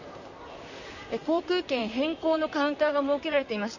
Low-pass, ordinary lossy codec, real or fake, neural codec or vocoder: 7.2 kHz; none; fake; vocoder, 44.1 kHz, 128 mel bands, Pupu-Vocoder